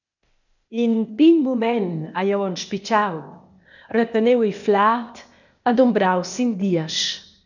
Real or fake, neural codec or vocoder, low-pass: fake; codec, 16 kHz, 0.8 kbps, ZipCodec; 7.2 kHz